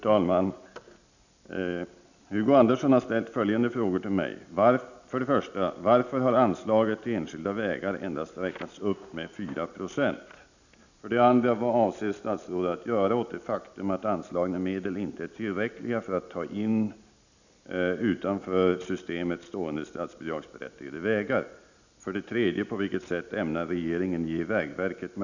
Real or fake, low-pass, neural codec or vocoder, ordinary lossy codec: real; 7.2 kHz; none; none